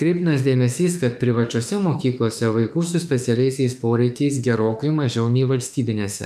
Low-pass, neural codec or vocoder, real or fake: 14.4 kHz; autoencoder, 48 kHz, 32 numbers a frame, DAC-VAE, trained on Japanese speech; fake